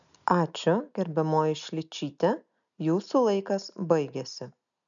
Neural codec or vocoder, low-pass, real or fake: none; 7.2 kHz; real